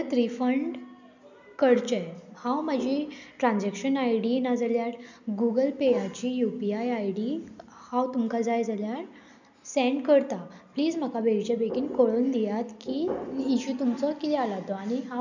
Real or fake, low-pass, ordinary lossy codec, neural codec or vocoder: real; 7.2 kHz; none; none